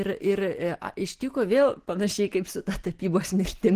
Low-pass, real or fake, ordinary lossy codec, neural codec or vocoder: 14.4 kHz; real; Opus, 16 kbps; none